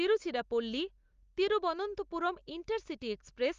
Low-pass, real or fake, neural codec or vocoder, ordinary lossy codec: 7.2 kHz; real; none; Opus, 32 kbps